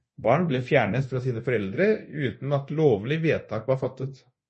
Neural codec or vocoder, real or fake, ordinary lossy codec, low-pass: codec, 24 kHz, 0.9 kbps, DualCodec; fake; MP3, 32 kbps; 10.8 kHz